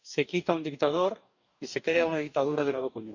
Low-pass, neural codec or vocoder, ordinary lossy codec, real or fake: 7.2 kHz; codec, 44.1 kHz, 2.6 kbps, DAC; none; fake